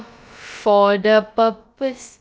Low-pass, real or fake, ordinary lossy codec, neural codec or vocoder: none; fake; none; codec, 16 kHz, about 1 kbps, DyCAST, with the encoder's durations